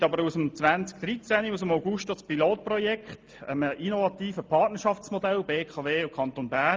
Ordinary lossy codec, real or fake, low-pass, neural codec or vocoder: Opus, 24 kbps; real; 7.2 kHz; none